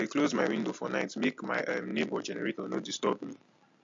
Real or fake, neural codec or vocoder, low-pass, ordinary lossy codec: real; none; 7.2 kHz; MP3, 48 kbps